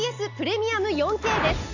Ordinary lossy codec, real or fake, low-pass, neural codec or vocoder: none; real; 7.2 kHz; none